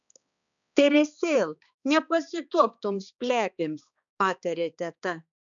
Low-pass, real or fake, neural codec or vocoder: 7.2 kHz; fake; codec, 16 kHz, 2 kbps, X-Codec, HuBERT features, trained on balanced general audio